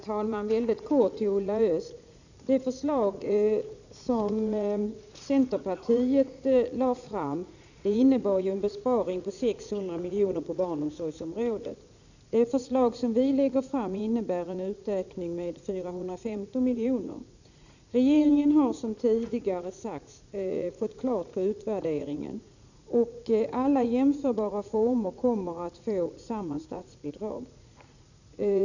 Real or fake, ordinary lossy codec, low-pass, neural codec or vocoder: fake; none; 7.2 kHz; vocoder, 44.1 kHz, 80 mel bands, Vocos